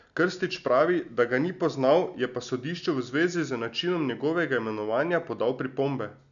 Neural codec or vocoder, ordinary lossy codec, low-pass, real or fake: none; none; 7.2 kHz; real